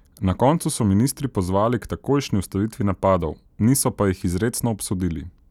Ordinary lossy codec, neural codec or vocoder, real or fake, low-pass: none; none; real; 19.8 kHz